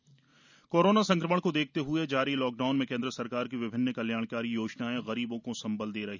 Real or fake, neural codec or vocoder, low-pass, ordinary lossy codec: real; none; 7.2 kHz; none